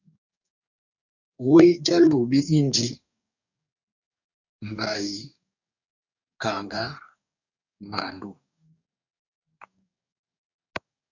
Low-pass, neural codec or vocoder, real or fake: 7.2 kHz; codec, 44.1 kHz, 2.6 kbps, DAC; fake